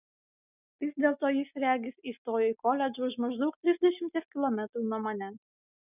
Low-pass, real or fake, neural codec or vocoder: 3.6 kHz; real; none